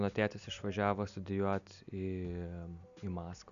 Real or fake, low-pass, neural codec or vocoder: real; 7.2 kHz; none